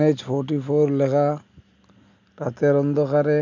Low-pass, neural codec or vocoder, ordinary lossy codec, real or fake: 7.2 kHz; none; none; real